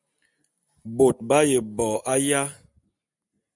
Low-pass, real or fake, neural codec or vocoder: 10.8 kHz; real; none